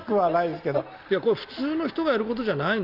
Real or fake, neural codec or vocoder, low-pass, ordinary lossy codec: real; none; 5.4 kHz; Opus, 24 kbps